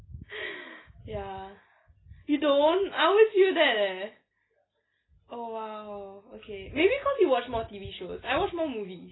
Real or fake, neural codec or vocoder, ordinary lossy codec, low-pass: real; none; AAC, 16 kbps; 7.2 kHz